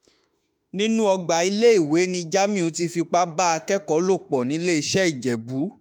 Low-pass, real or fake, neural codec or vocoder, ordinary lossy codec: none; fake; autoencoder, 48 kHz, 32 numbers a frame, DAC-VAE, trained on Japanese speech; none